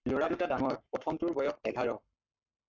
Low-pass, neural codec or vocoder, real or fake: 7.2 kHz; codec, 44.1 kHz, 7.8 kbps, Pupu-Codec; fake